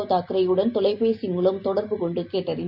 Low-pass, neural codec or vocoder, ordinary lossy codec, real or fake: 5.4 kHz; none; Opus, 64 kbps; real